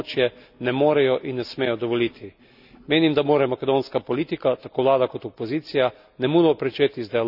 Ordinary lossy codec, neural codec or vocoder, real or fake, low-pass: none; none; real; 5.4 kHz